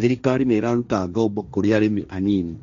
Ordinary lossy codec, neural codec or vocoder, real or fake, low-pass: MP3, 96 kbps; codec, 16 kHz, 1.1 kbps, Voila-Tokenizer; fake; 7.2 kHz